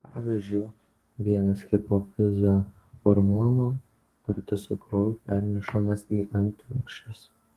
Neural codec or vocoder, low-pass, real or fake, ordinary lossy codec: codec, 32 kHz, 1.9 kbps, SNAC; 14.4 kHz; fake; Opus, 24 kbps